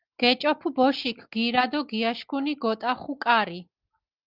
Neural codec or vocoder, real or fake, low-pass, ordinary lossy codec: none; real; 5.4 kHz; Opus, 32 kbps